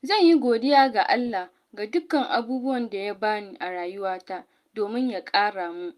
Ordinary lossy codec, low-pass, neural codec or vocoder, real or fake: Opus, 32 kbps; 14.4 kHz; none; real